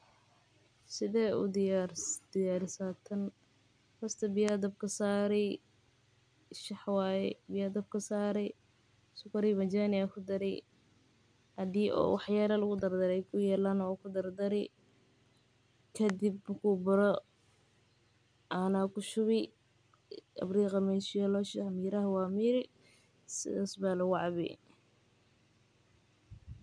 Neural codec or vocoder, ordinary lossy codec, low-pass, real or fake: none; none; 9.9 kHz; real